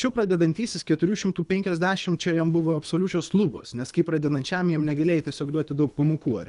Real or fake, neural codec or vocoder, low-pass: fake; codec, 24 kHz, 3 kbps, HILCodec; 10.8 kHz